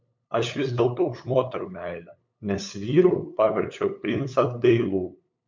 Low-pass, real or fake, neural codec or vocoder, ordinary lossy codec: 7.2 kHz; fake; codec, 16 kHz, 8 kbps, FunCodec, trained on LibriTTS, 25 frames a second; MP3, 64 kbps